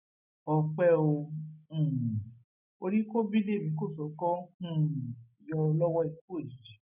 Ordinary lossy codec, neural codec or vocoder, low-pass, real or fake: none; none; 3.6 kHz; real